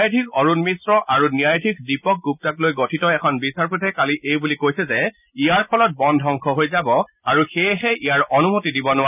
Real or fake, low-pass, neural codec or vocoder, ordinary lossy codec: real; 3.6 kHz; none; none